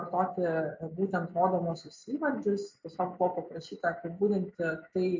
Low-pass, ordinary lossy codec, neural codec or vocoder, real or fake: 7.2 kHz; MP3, 48 kbps; none; real